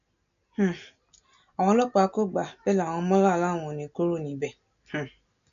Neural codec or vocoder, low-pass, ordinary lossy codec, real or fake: none; 7.2 kHz; none; real